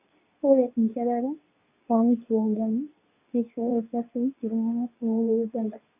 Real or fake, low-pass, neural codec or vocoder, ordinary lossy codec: fake; 3.6 kHz; codec, 24 kHz, 0.9 kbps, WavTokenizer, medium speech release version 2; none